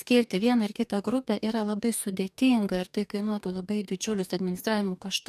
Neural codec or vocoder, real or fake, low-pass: codec, 44.1 kHz, 2.6 kbps, DAC; fake; 14.4 kHz